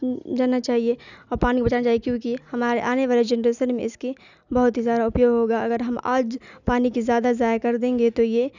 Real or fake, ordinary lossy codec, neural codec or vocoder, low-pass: real; none; none; 7.2 kHz